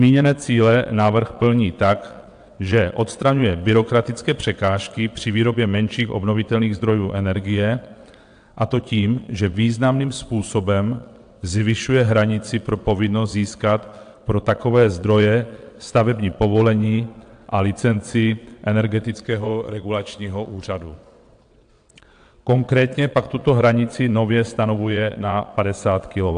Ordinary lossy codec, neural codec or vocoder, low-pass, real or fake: AAC, 64 kbps; vocoder, 22.05 kHz, 80 mel bands, WaveNeXt; 9.9 kHz; fake